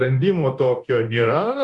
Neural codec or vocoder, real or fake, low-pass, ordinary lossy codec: codec, 24 kHz, 0.9 kbps, DualCodec; fake; 10.8 kHz; Opus, 64 kbps